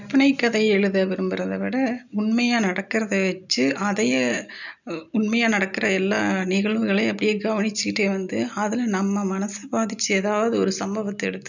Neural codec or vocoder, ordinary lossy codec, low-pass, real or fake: none; none; 7.2 kHz; real